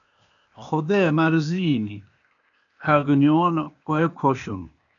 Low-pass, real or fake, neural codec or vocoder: 7.2 kHz; fake; codec, 16 kHz, 0.8 kbps, ZipCodec